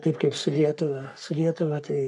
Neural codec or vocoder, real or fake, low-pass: codec, 44.1 kHz, 3.4 kbps, Pupu-Codec; fake; 10.8 kHz